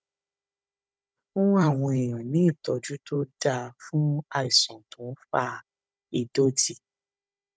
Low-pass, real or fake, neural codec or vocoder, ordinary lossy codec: none; fake; codec, 16 kHz, 16 kbps, FunCodec, trained on Chinese and English, 50 frames a second; none